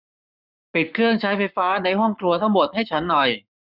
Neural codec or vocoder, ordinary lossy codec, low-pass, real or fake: codec, 44.1 kHz, 7.8 kbps, Pupu-Codec; none; 5.4 kHz; fake